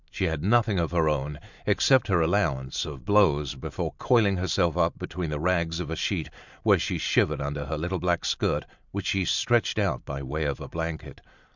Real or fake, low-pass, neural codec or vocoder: fake; 7.2 kHz; vocoder, 44.1 kHz, 128 mel bands every 512 samples, BigVGAN v2